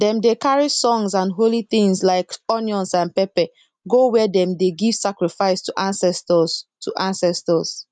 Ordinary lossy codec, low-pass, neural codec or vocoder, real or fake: none; 9.9 kHz; none; real